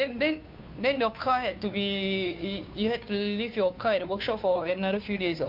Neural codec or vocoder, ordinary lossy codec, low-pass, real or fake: codec, 16 kHz, 2 kbps, FunCodec, trained on Chinese and English, 25 frames a second; MP3, 48 kbps; 5.4 kHz; fake